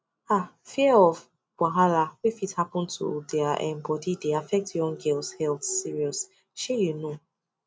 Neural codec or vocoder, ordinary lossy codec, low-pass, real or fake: none; none; none; real